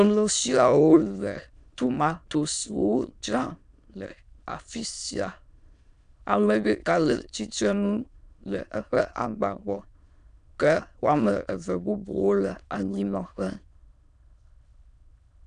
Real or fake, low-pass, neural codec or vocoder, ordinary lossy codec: fake; 9.9 kHz; autoencoder, 22.05 kHz, a latent of 192 numbers a frame, VITS, trained on many speakers; MP3, 96 kbps